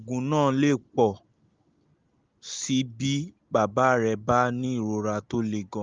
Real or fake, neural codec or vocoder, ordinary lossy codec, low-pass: real; none; Opus, 32 kbps; 7.2 kHz